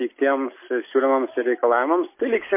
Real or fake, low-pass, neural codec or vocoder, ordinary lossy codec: real; 3.6 kHz; none; MP3, 24 kbps